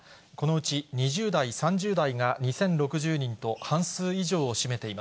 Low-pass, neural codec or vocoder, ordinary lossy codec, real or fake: none; none; none; real